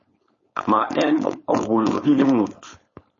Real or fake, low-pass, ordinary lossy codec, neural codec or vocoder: fake; 7.2 kHz; MP3, 32 kbps; codec, 16 kHz, 4.8 kbps, FACodec